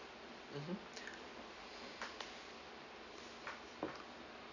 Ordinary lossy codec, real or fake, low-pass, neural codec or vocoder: none; real; 7.2 kHz; none